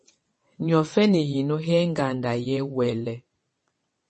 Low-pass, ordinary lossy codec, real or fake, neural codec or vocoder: 9.9 kHz; MP3, 32 kbps; fake; vocoder, 22.05 kHz, 80 mel bands, WaveNeXt